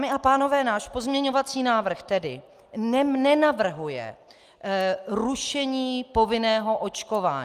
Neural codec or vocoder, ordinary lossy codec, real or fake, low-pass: none; Opus, 32 kbps; real; 14.4 kHz